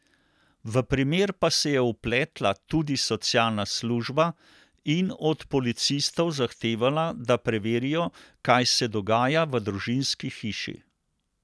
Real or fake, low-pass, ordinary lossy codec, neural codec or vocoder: real; none; none; none